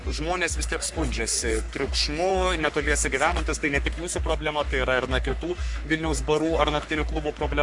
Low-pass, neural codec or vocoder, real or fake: 10.8 kHz; codec, 44.1 kHz, 3.4 kbps, Pupu-Codec; fake